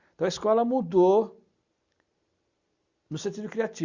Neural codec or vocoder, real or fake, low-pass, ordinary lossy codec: none; real; 7.2 kHz; Opus, 64 kbps